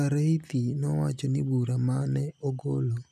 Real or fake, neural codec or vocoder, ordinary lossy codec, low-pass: real; none; none; 14.4 kHz